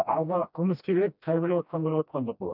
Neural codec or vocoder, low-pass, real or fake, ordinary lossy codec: codec, 16 kHz, 1 kbps, FreqCodec, smaller model; 5.4 kHz; fake; none